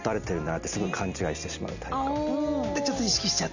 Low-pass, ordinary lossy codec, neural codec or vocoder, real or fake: 7.2 kHz; none; none; real